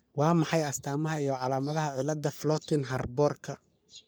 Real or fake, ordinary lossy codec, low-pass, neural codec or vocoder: fake; none; none; codec, 44.1 kHz, 3.4 kbps, Pupu-Codec